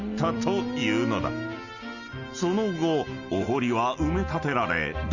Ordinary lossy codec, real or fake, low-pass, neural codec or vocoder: none; real; 7.2 kHz; none